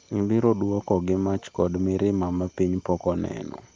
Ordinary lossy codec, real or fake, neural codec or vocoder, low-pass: Opus, 24 kbps; real; none; 7.2 kHz